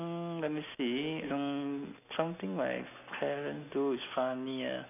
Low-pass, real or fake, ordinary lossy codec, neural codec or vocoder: 3.6 kHz; real; none; none